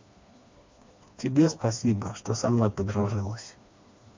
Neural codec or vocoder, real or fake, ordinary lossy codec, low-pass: codec, 16 kHz, 2 kbps, FreqCodec, smaller model; fake; MP3, 48 kbps; 7.2 kHz